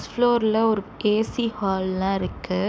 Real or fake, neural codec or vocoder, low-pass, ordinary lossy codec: real; none; none; none